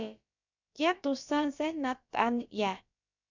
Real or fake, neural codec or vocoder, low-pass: fake; codec, 16 kHz, about 1 kbps, DyCAST, with the encoder's durations; 7.2 kHz